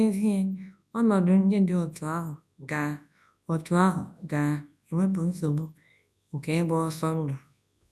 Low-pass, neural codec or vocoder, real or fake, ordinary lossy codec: none; codec, 24 kHz, 0.9 kbps, WavTokenizer, large speech release; fake; none